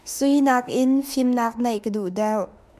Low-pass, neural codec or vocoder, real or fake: 14.4 kHz; autoencoder, 48 kHz, 32 numbers a frame, DAC-VAE, trained on Japanese speech; fake